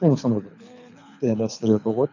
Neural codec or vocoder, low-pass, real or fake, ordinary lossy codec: codec, 24 kHz, 6 kbps, HILCodec; 7.2 kHz; fake; none